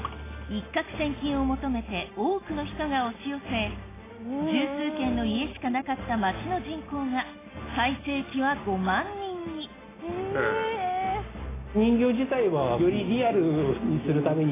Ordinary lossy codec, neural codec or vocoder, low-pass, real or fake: AAC, 16 kbps; none; 3.6 kHz; real